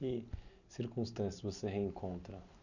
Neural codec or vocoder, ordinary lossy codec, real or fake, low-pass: none; none; real; 7.2 kHz